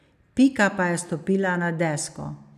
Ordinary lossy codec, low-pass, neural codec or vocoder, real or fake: none; 14.4 kHz; none; real